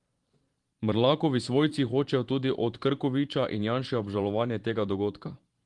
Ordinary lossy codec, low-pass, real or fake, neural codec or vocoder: Opus, 24 kbps; 10.8 kHz; fake; vocoder, 44.1 kHz, 128 mel bands every 512 samples, BigVGAN v2